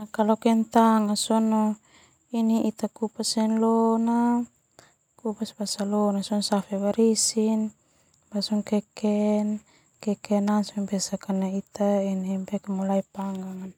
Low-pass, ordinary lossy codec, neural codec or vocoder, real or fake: 19.8 kHz; none; none; real